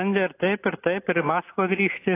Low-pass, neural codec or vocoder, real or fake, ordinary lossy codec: 3.6 kHz; none; real; AAC, 24 kbps